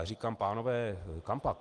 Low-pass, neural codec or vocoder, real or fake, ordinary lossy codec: 10.8 kHz; none; real; Opus, 24 kbps